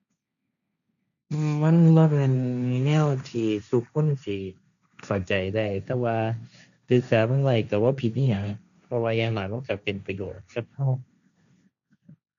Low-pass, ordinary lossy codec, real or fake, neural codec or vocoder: 7.2 kHz; none; fake; codec, 16 kHz, 1.1 kbps, Voila-Tokenizer